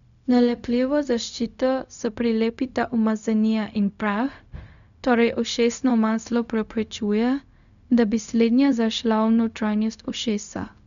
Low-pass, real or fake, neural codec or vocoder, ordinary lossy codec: 7.2 kHz; fake; codec, 16 kHz, 0.4 kbps, LongCat-Audio-Codec; none